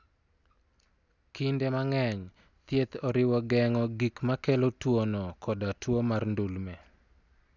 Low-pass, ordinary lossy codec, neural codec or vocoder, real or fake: 7.2 kHz; none; none; real